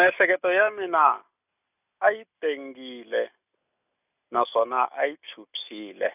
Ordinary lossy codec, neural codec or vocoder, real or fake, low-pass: none; none; real; 3.6 kHz